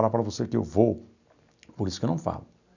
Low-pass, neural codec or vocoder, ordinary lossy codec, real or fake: 7.2 kHz; none; none; real